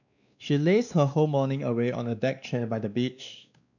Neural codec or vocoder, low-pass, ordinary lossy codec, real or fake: codec, 16 kHz, 2 kbps, X-Codec, WavLM features, trained on Multilingual LibriSpeech; 7.2 kHz; none; fake